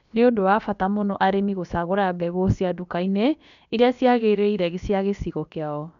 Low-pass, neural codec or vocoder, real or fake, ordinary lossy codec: 7.2 kHz; codec, 16 kHz, about 1 kbps, DyCAST, with the encoder's durations; fake; none